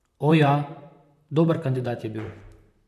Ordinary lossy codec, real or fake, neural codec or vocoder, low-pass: MP3, 96 kbps; fake; vocoder, 44.1 kHz, 128 mel bands, Pupu-Vocoder; 14.4 kHz